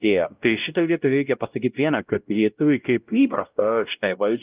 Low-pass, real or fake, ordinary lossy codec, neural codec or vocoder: 3.6 kHz; fake; Opus, 32 kbps; codec, 16 kHz, 0.5 kbps, X-Codec, WavLM features, trained on Multilingual LibriSpeech